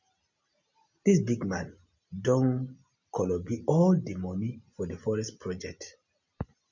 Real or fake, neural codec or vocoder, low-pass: real; none; 7.2 kHz